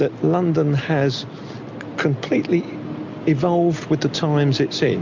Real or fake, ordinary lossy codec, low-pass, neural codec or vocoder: real; MP3, 64 kbps; 7.2 kHz; none